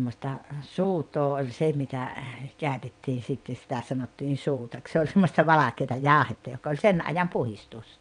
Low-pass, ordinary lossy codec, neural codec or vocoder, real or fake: 9.9 kHz; none; vocoder, 22.05 kHz, 80 mel bands, WaveNeXt; fake